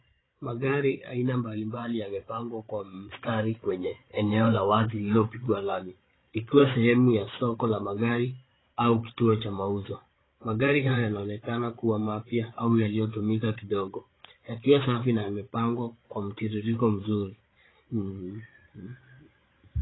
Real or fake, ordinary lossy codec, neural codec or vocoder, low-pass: fake; AAC, 16 kbps; codec, 16 kHz, 8 kbps, FreqCodec, larger model; 7.2 kHz